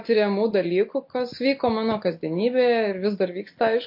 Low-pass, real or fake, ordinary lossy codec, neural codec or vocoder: 5.4 kHz; real; MP3, 32 kbps; none